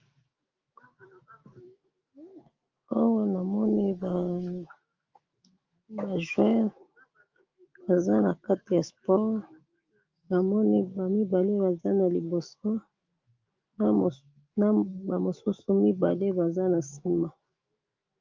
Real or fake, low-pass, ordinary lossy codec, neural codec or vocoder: fake; 7.2 kHz; Opus, 24 kbps; autoencoder, 48 kHz, 128 numbers a frame, DAC-VAE, trained on Japanese speech